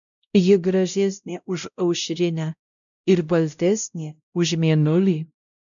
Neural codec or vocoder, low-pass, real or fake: codec, 16 kHz, 0.5 kbps, X-Codec, WavLM features, trained on Multilingual LibriSpeech; 7.2 kHz; fake